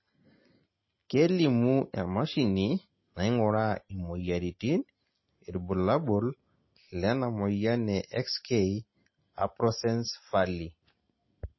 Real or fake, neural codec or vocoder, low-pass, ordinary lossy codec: real; none; 7.2 kHz; MP3, 24 kbps